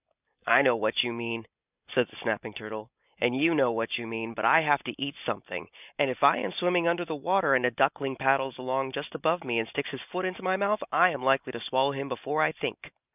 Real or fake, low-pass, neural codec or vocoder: real; 3.6 kHz; none